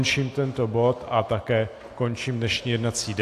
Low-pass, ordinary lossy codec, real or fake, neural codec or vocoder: 14.4 kHz; AAC, 64 kbps; real; none